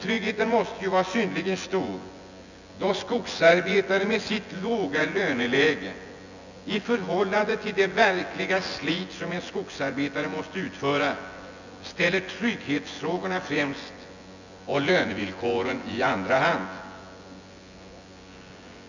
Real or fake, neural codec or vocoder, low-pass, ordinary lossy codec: fake; vocoder, 24 kHz, 100 mel bands, Vocos; 7.2 kHz; none